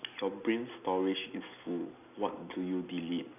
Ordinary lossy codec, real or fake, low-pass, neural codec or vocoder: none; real; 3.6 kHz; none